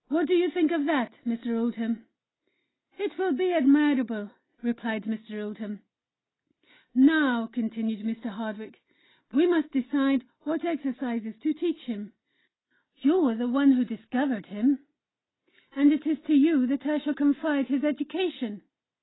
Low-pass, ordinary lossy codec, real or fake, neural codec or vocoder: 7.2 kHz; AAC, 16 kbps; real; none